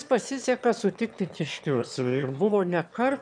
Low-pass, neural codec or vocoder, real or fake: 9.9 kHz; autoencoder, 22.05 kHz, a latent of 192 numbers a frame, VITS, trained on one speaker; fake